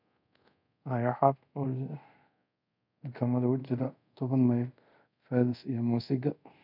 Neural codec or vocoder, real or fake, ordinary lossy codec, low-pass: codec, 24 kHz, 0.5 kbps, DualCodec; fake; none; 5.4 kHz